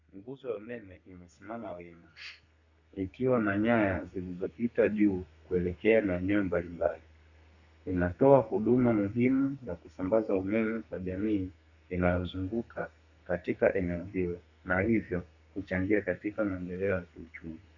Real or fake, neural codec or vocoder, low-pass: fake; codec, 32 kHz, 1.9 kbps, SNAC; 7.2 kHz